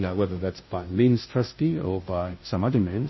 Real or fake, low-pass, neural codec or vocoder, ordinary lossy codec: fake; 7.2 kHz; codec, 16 kHz, 0.5 kbps, FunCodec, trained on Chinese and English, 25 frames a second; MP3, 24 kbps